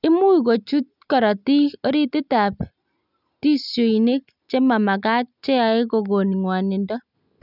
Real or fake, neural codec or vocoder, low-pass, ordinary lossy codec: real; none; 5.4 kHz; none